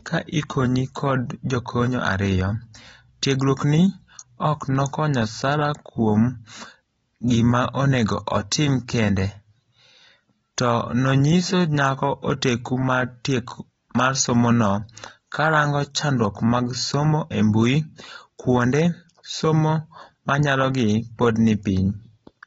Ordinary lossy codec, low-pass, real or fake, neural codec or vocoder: AAC, 24 kbps; 9.9 kHz; real; none